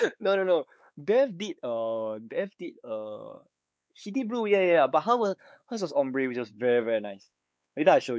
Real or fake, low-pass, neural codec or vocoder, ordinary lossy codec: fake; none; codec, 16 kHz, 4 kbps, X-Codec, WavLM features, trained on Multilingual LibriSpeech; none